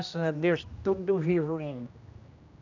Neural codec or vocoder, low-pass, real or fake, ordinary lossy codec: codec, 16 kHz, 1 kbps, X-Codec, HuBERT features, trained on general audio; 7.2 kHz; fake; none